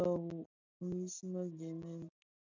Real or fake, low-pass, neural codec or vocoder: real; 7.2 kHz; none